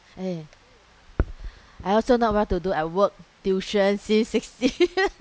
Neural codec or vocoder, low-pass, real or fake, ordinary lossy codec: none; none; real; none